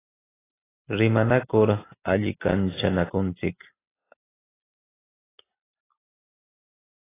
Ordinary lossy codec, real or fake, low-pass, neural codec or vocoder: AAC, 16 kbps; real; 3.6 kHz; none